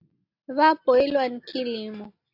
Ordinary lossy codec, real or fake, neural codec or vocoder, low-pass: AAC, 32 kbps; real; none; 5.4 kHz